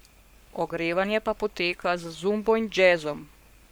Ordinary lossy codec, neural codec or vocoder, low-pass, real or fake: none; codec, 44.1 kHz, 7.8 kbps, Pupu-Codec; none; fake